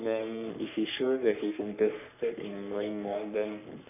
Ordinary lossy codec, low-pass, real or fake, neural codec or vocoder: none; 3.6 kHz; fake; codec, 44.1 kHz, 3.4 kbps, Pupu-Codec